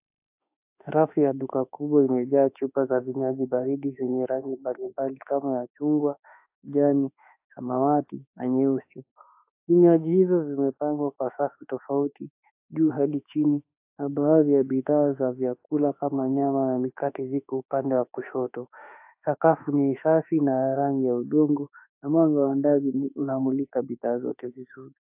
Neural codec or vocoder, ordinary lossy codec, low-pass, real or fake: autoencoder, 48 kHz, 32 numbers a frame, DAC-VAE, trained on Japanese speech; AAC, 32 kbps; 3.6 kHz; fake